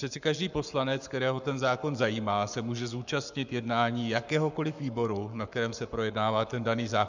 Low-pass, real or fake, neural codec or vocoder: 7.2 kHz; fake; codec, 44.1 kHz, 7.8 kbps, Pupu-Codec